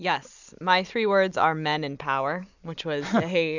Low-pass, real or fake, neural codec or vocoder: 7.2 kHz; real; none